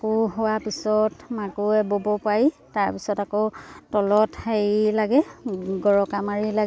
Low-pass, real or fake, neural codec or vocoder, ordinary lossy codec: none; real; none; none